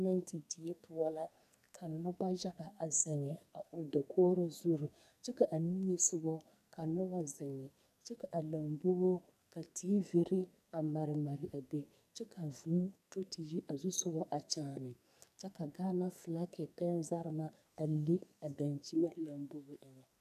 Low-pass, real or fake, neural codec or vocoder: 14.4 kHz; fake; codec, 44.1 kHz, 2.6 kbps, SNAC